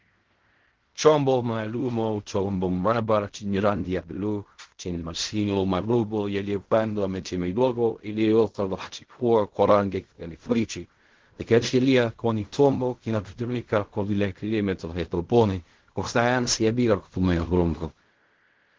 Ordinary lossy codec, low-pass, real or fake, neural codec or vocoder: Opus, 16 kbps; 7.2 kHz; fake; codec, 16 kHz in and 24 kHz out, 0.4 kbps, LongCat-Audio-Codec, fine tuned four codebook decoder